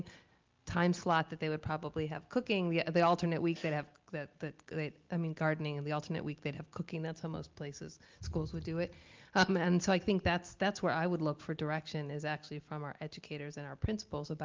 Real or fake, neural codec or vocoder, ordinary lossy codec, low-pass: real; none; Opus, 32 kbps; 7.2 kHz